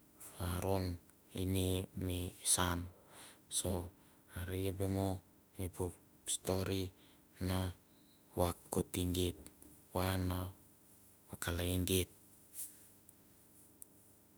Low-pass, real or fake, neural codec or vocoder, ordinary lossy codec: none; fake; autoencoder, 48 kHz, 32 numbers a frame, DAC-VAE, trained on Japanese speech; none